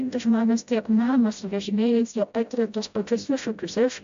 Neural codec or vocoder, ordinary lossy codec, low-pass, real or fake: codec, 16 kHz, 0.5 kbps, FreqCodec, smaller model; AAC, 64 kbps; 7.2 kHz; fake